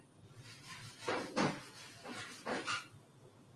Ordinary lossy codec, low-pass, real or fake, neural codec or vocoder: Opus, 32 kbps; 10.8 kHz; real; none